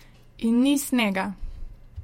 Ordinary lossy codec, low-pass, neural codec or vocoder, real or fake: MP3, 64 kbps; 19.8 kHz; vocoder, 48 kHz, 128 mel bands, Vocos; fake